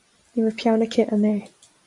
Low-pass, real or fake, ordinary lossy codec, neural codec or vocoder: 10.8 kHz; real; MP3, 64 kbps; none